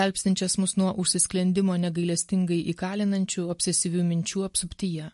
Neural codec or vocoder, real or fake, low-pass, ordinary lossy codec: none; real; 14.4 kHz; MP3, 48 kbps